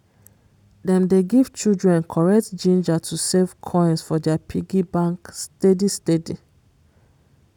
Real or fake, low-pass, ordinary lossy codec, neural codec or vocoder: real; 19.8 kHz; none; none